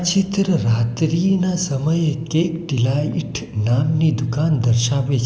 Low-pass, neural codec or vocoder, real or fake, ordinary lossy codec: none; none; real; none